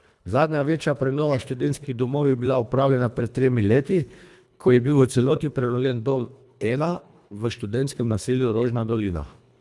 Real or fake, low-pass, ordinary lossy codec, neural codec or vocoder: fake; none; none; codec, 24 kHz, 1.5 kbps, HILCodec